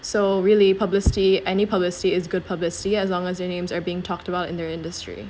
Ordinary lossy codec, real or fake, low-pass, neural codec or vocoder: none; real; none; none